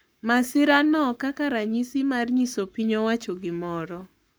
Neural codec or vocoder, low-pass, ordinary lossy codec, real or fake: codec, 44.1 kHz, 7.8 kbps, Pupu-Codec; none; none; fake